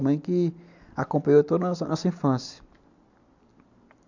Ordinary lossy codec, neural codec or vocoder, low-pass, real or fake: none; none; 7.2 kHz; real